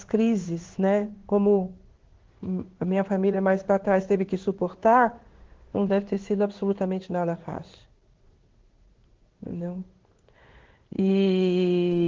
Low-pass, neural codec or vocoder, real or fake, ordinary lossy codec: 7.2 kHz; codec, 16 kHz in and 24 kHz out, 1 kbps, XY-Tokenizer; fake; Opus, 16 kbps